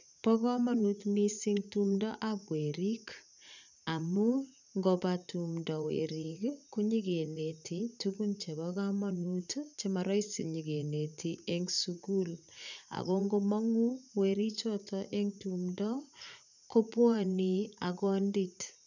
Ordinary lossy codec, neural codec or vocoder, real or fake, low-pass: none; vocoder, 44.1 kHz, 80 mel bands, Vocos; fake; 7.2 kHz